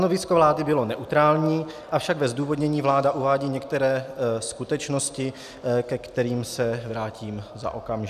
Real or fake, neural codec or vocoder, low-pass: real; none; 14.4 kHz